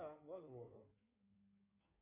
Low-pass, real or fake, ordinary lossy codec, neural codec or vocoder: 3.6 kHz; fake; MP3, 24 kbps; codec, 16 kHz in and 24 kHz out, 2.2 kbps, FireRedTTS-2 codec